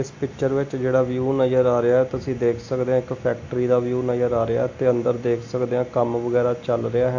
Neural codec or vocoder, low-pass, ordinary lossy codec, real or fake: none; 7.2 kHz; AAC, 48 kbps; real